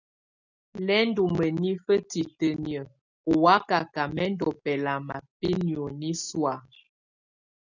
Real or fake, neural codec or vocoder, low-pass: real; none; 7.2 kHz